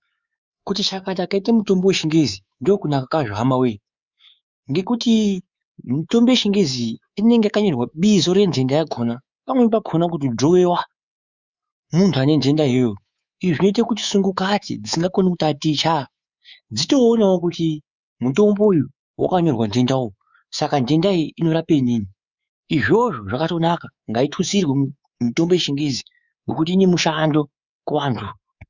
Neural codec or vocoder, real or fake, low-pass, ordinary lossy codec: codec, 24 kHz, 3.1 kbps, DualCodec; fake; 7.2 kHz; Opus, 64 kbps